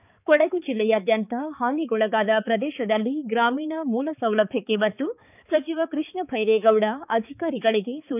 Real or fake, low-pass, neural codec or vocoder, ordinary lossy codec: fake; 3.6 kHz; codec, 16 kHz, 4 kbps, X-Codec, HuBERT features, trained on balanced general audio; none